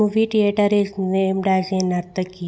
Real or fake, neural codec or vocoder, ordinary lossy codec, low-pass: real; none; none; none